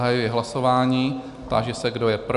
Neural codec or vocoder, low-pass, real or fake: none; 10.8 kHz; real